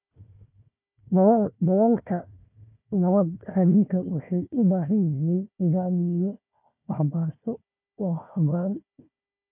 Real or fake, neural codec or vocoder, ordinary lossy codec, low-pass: fake; codec, 16 kHz, 1 kbps, FunCodec, trained on Chinese and English, 50 frames a second; none; 3.6 kHz